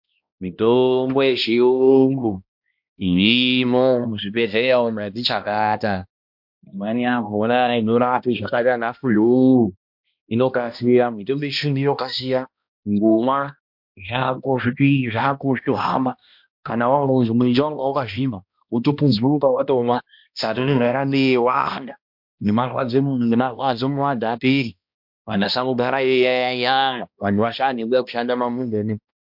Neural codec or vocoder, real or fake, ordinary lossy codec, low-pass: codec, 16 kHz, 1 kbps, X-Codec, HuBERT features, trained on balanced general audio; fake; MP3, 48 kbps; 5.4 kHz